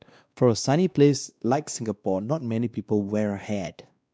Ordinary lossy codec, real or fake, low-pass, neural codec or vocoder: none; fake; none; codec, 16 kHz, 2 kbps, X-Codec, WavLM features, trained on Multilingual LibriSpeech